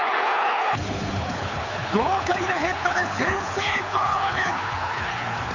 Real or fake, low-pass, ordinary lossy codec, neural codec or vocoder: fake; 7.2 kHz; none; codec, 24 kHz, 6 kbps, HILCodec